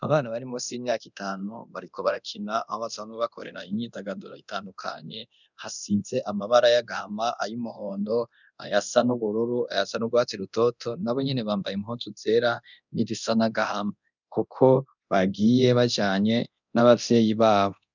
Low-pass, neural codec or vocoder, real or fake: 7.2 kHz; codec, 24 kHz, 0.9 kbps, DualCodec; fake